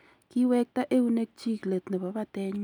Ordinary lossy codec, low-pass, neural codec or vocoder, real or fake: none; 19.8 kHz; none; real